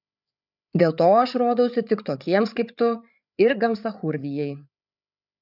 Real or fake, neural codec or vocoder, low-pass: fake; codec, 16 kHz, 8 kbps, FreqCodec, larger model; 5.4 kHz